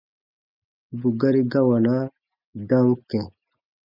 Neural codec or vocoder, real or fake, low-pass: none; real; 5.4 kHz